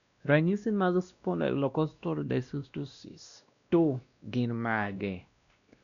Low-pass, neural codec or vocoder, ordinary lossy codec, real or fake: 7.2 kHz; codec, 16 kHz, 1 kbps, X-Codec, WavLM features, trained on Multilingual LibriSpeech; none; fake